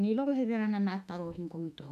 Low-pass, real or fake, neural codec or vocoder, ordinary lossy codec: 14.4 kHz; fake; autoencoder, 48 kHz, 32 numbers a frame, DAC-VAE, trained on Japanese speech; none